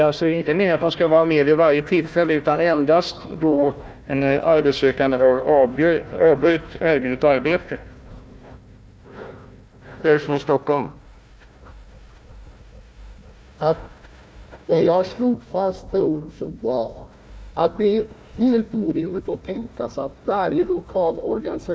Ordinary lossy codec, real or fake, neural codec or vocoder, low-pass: none; fake; codec, 16 kHz, 1 kbps, FunCodec, trained on Chinese and English, 50 frames a second; none